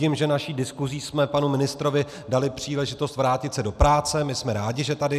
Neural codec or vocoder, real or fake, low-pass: none; real; 14.4 kHz